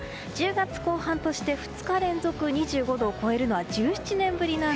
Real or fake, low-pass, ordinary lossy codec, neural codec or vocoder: real; none; none; none